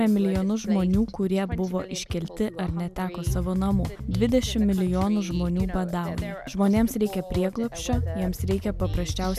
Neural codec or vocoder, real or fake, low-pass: none; real; 14.4 kHz